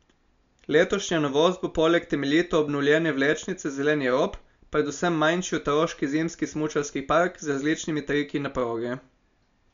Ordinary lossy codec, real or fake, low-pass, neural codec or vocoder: MP3, 64 kbps; real; 7.2 kHz; none